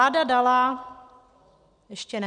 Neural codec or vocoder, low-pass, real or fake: none; 9.9 kHz; real